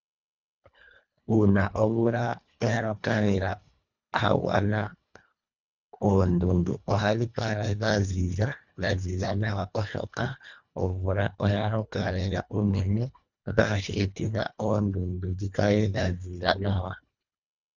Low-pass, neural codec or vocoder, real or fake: 7.2 kHz; codec, 24 kHz, 1.5 kbps, HILCodec; fake